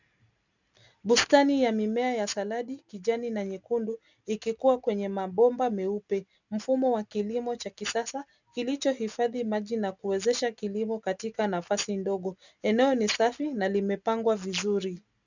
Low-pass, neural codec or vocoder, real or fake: 7.2 kHz; none; real